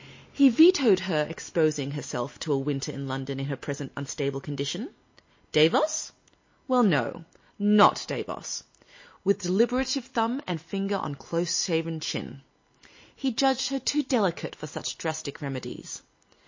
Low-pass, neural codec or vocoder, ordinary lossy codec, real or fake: 7.2 kHz; none; MP3, 32 kbps; real